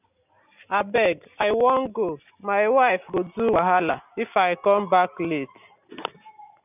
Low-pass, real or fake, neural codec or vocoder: 3.6 kHz; real; none